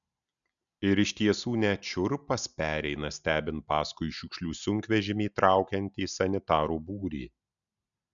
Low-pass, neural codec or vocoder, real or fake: 7.2 kHz; none; real